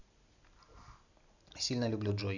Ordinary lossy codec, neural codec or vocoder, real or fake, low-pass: none; none; real; 7.2 kHz